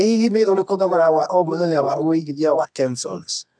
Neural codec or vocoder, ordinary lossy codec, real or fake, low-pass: codec, 24 kHz, 0.9 kbps, WavTokenizer, medium music audio release; none; fake; 9.9 kHz